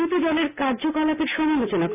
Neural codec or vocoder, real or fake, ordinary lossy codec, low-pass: none; real; none; 3.6 kHz